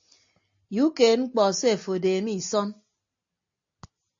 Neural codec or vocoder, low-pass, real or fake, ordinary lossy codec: none; 7.2 kHz; real; AAC, 64 kbps